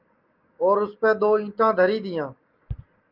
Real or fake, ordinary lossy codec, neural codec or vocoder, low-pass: real; Opus, 24 kbps; none; 5.4 kHz